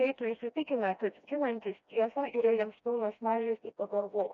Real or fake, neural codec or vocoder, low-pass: fake; codec, 16 kHz, 1 kbps, FreqCodec, smaller model; 7.2 kHz